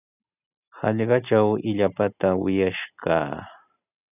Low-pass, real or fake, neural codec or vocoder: 3.6 kHz; real; none